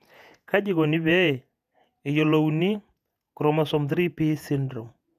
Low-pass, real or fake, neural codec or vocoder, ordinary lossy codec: 14.4 kHz; fake; vocoder, 48 kHz, 128 mel bands, Vocos; none